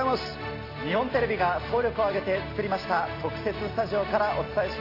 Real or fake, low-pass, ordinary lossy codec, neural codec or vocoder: real; 5.4 kHz; AAC, 32 kbps; none